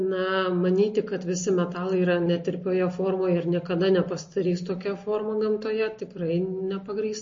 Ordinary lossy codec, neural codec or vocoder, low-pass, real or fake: MP3, 32 kbps; none; 7.2 kHz; real